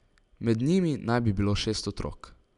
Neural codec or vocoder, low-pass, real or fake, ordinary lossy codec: none; 10.8 kHz; real; none